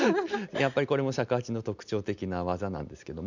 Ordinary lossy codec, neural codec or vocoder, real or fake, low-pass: none; none; real; 7.2 kHz